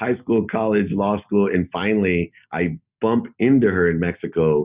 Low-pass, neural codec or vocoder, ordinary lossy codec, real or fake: 3.6 kHz; none; Opus, 64 kbps; real